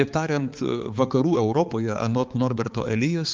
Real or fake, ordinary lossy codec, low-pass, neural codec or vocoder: fake; Opus, 24 kbps; 7.2 kHz; codec, 16 kHz, 4 kbps, X-Codec, HuBERT features, trained on balanced general audio